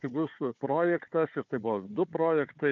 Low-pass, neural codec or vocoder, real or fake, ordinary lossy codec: 7.2 kHz; codec, 16 kHz, 4 kbps, FunCodec, trained on Chinese and English, 50 frames a second; fake; MP3, 64 kbps